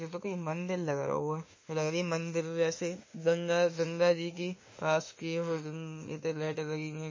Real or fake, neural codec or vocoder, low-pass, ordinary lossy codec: fake; autoencoder, 48 kHz, 32 numbers a frame, DAC-VAE, trained on Japanese speech; 7.2 kHz; MP3, 32 kbps